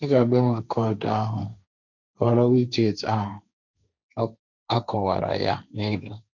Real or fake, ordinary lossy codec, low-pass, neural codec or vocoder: fake; none; 7.2 kHz; codec, 16 kHz, 1.1 kbps, Voila-Tokenizer